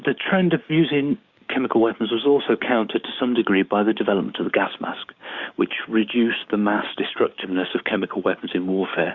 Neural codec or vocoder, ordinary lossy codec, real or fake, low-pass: codec, 16 kHz, 6 kbps, DAC; Opus, 64 kbps; fake; 7.2 kHz